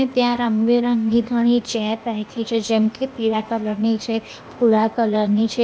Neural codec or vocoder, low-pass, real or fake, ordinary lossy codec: codec, 16 kHz, 0.8 kbps, ZipCodec; none; fake; none